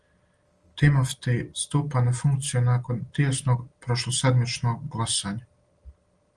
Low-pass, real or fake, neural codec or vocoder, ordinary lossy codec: 9.9 kHz; real; none; Opus, 24 kbps